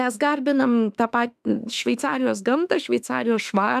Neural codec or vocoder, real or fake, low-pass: autoencoder, 48 kHz, 32 numbers a frame, DAC-VAE, trained on Japanese speech; fake; 14.4 kHz